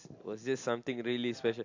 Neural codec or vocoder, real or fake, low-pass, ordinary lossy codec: none; real; 7.2 kHz; AAC, 48 kbps